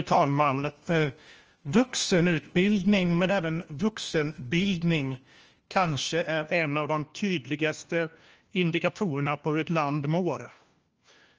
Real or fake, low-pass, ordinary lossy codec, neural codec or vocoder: fake; 7.2 kHz; Opus, 24 kbps; codec, 16 kHz, 1 kbps, FunCodec, trained on LibriTTS, 50 frames a second